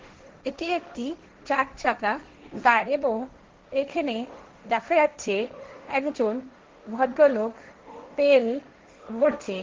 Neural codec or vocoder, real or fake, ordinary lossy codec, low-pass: codec, 16 kHz, 1.1 kbps, Voila-Tokenizer; fake; Opus, 16 kbps; 7.2 kHz